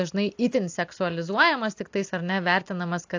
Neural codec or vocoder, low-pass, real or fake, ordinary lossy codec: none; 7.2 kHz; real; AAC, 48 kbps